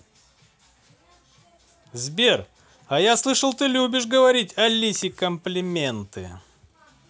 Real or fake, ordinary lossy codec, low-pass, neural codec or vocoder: real; none; none; none